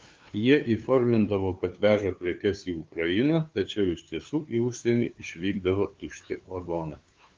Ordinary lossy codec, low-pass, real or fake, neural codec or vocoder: Opus, 32 kbps; 7.2 kHz; fake; codec, 16 kHz, 2 kbps, FunCodec, trained on LibriTTS, 25 frames a second